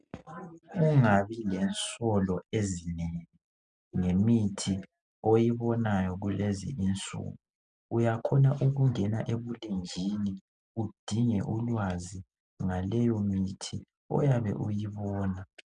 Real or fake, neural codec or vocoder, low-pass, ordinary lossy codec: real; none; 9.9 kHz; MP3, 96 kbps